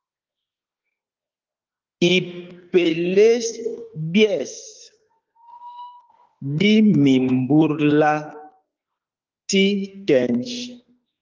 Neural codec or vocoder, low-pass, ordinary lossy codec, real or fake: autoencoder, 48 kHz, 32 numbers a frame, DAC-VAE, trained on Japanese speech; 7.2 kHz; Opus, 32 kbps; fake